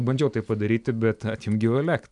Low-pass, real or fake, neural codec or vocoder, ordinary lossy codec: 10.8 kHz; real; none; MP3, 96 kbps